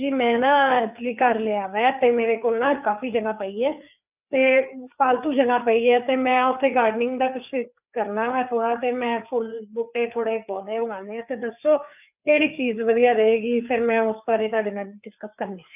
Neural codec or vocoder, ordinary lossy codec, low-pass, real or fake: codec, 16 kHz, 4 kbps, FreqCodec, larger model; none; 3.6 kHz; fake